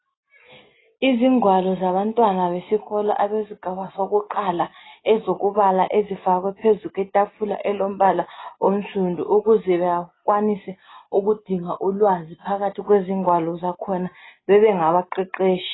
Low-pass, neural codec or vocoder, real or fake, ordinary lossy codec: 7.2 kHz; none; real; AAC, 16 kbps